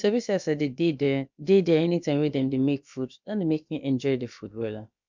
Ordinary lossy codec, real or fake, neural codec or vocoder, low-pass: MP3, 64 kbps; fake; codec, 16 kHz, about 1 kbps, DyCAST, with the encoder's durations; 7.2 kHz